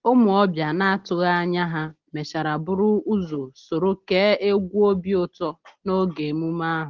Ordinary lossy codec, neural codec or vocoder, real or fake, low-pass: Opus, 16 kbps; none; real; 7.2 kHz